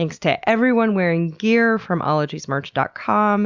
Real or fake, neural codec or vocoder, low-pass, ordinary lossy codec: real; none; 7.2 kHz; Opus, 64 kbps